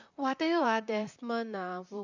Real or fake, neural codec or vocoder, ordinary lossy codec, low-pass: fake; vocoder, 44.1 kHz, 128 mel bands, Pupu-Vocoder; none; 7.2 kHz